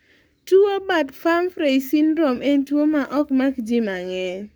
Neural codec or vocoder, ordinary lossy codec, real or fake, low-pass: codec, 44.1 kHz, 7.8 kbps, Pupu-Codec; none; fake; none